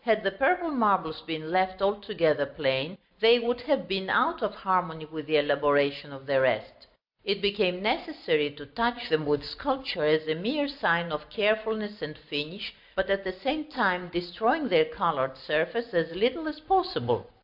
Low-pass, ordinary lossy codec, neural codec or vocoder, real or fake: 5.4 kHz; AAC, 48 kbps; none; real